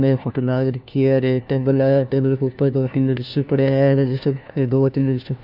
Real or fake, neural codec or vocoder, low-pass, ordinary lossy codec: fake; codec, 16 kHz, 1 kbps, FunCodec, trained on LibriTTS, 50 frames a second; 5.4 kHz; none